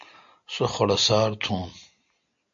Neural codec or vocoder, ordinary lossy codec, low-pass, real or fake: none; MP3, 48 kbps; 7.2 kHz; real